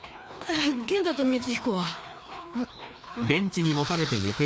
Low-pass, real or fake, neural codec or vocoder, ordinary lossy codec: none; fake; codec, 16 kHz, 2 kbps, FreqCodec, larger model; none